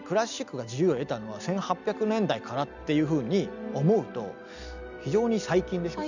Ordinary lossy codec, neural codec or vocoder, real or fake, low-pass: none; none; real; 7.2 kHz